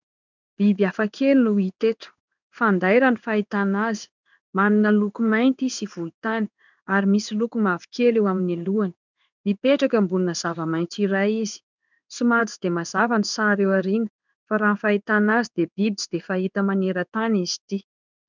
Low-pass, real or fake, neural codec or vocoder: 7.2 kHz; fake; codec, 16 kHz in and 24 kHz out, 1 kbps, XY-Tokenizer